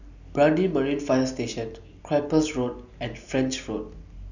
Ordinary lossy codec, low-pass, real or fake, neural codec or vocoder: none; 7.2 kHz; real; none